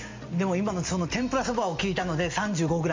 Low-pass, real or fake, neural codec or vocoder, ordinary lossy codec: 7.2 kHz; real; none; MP3, 64 kbps